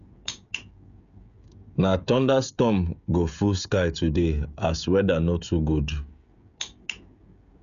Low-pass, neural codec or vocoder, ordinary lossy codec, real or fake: 7.2 kHz; codec, 16 kHz, 16 kbps, FreqCodec, smaller model; none; fake